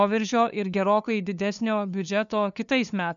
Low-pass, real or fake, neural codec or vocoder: 7.2 kHz; fake; codec, 16 kHz, 2 kbps, FunCodec, trained on LibriTTS, 25 frames a second